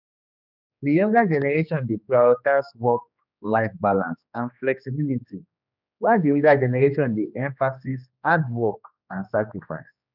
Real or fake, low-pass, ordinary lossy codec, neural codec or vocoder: fake; 5.4 kHz; none; codec, 16 kHz, 2 kbps, X-Codec, HuBERT features, trained on general audio